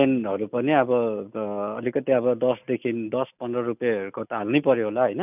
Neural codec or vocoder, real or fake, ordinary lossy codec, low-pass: none; real; none; 3.6 kHz